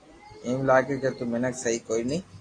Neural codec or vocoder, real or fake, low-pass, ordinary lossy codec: none; real; 9.9 kHz; AAC, 32 kbps